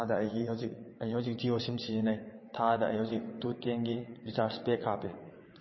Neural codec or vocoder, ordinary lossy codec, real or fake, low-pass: vocoder, 22.05 kHz, 80 mel bands, WaveNeXt; MP3, 24 kbps; fake; 7.2 kHz